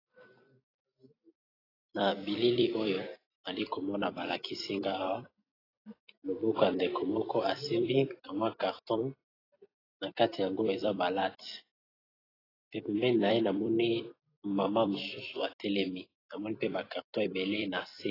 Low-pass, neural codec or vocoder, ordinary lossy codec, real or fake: 5.4 kHz; vocoder, 44.1 kHz, 128 mel bands every 256 samples, BigVGAN v2; AAC, 24 kbps; fake